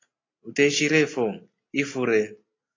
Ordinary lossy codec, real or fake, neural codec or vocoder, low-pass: AAC, 32 kbps; real; none; 7.2 kHz